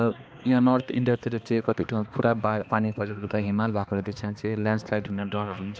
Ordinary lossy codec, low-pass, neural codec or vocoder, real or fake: none; none; codec, 16 kHz, 2 kbps, X-Codec, HuBERT features, trained on balanced general audio; fake